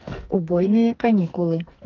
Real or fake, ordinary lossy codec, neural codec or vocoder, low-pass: fake; Opus, 32 kbps; codec, 44.1 kHz, 2.6 kbps, SNAC; 7.2 kHz